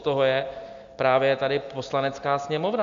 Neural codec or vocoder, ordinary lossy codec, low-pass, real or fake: none; MP3, 64 kbps; 7.2 kHz; real